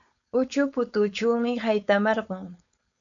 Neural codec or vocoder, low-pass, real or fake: codec, 16 kHz, 4.8 kbps, FACodec; 7.2 kHz; fake